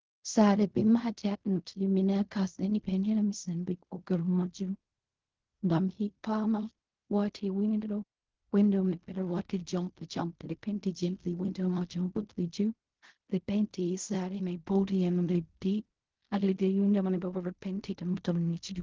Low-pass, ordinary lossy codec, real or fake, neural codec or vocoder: 7.2 kHz; Opus, 16 kbps; fake; codec, 16 kHz in and 24 kHz out, 0.4 kbps, LongCat-Audio-Codec, fine tuned four codebook decoder